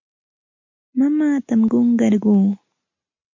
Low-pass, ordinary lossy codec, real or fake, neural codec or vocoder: 7.2 kHz; MP3, 48 kbps; real; none